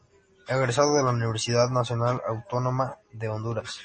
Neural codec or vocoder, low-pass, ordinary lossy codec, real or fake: none; 10.8 kHz; MP3, 32 kbps; real